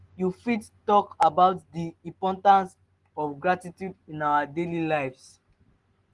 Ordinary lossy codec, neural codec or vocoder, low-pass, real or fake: Opus, 24 kbps; none; 10.8 kHz; real